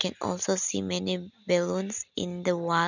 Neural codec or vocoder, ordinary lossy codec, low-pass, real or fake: none; none; 7.2 kHz; real